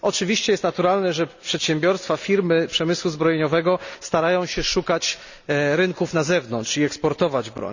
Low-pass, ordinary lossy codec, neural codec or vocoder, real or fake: 7.2 kHz; none; none; real